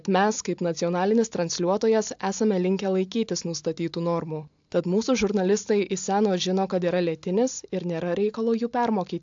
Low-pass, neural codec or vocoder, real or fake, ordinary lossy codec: 7.2 kHz; none; real; AAC, 64 kbps